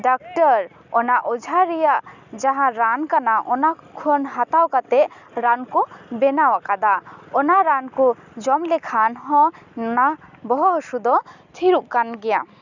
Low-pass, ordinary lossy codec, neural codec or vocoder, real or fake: 7.2 kHz; none; none; real